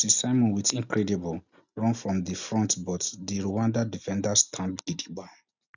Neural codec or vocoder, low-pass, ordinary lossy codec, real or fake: none; 7.2 kHz; none; real